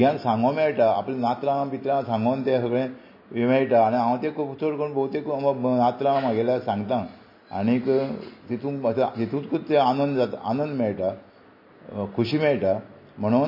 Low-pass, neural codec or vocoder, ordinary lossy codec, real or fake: 5.4 kHz; none; MP3, 24 kbps; real